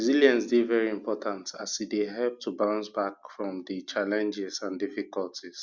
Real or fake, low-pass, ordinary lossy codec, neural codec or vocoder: real; 7.2 kHz; Opus, 64 kbps; none